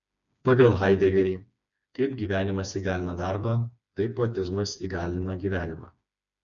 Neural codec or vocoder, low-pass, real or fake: codec, 16 kHz, 2 kbps, FreqCodec, smaller model; 7.2 kHz; fake